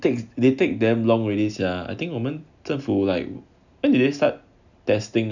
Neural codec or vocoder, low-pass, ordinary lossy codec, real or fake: none; 7.2 kHz; none; real